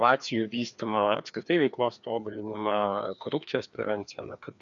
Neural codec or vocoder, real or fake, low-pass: codec, 16 kHz, 2 kbps, FreqCodec, larger model; fake; 7.2 kHz